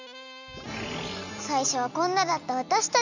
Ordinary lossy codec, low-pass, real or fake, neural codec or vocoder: none; 7.2 kHz; real; none